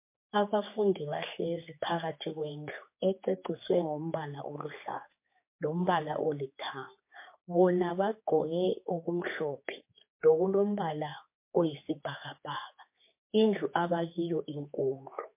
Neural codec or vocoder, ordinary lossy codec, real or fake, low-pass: codec, 16 kHz, 4 kbps, X-Codec, HuBERT features, trained on general audio; MP3, 24 kbps; fake; 3.6 kHz